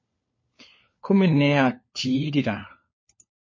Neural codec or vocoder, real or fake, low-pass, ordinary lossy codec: codec, 16 kHz, 4 kbps, FunCodec, trained on LibriTTS, 50 frames a second; fake; 7.2 kHz; MP3, 32 kbps